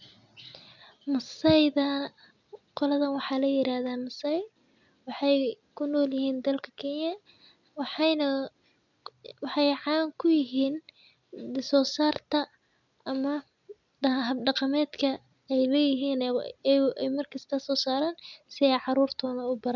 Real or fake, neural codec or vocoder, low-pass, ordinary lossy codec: real; none; 7.2 kHz; none